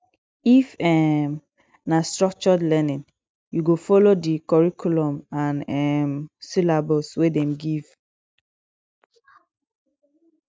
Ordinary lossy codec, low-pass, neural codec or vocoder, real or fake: none; none; none; real